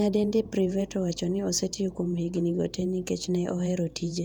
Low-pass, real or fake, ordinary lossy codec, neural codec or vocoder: 19.8 kHz; fake; none; vocoder, 48 kHz, 128 mel bands, Vocos